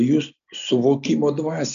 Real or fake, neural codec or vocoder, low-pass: real; none; 7.2 kHz